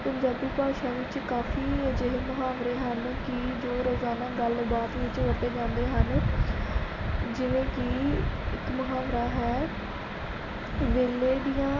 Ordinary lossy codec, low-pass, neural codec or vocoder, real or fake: none; 7.2 kHz; none; real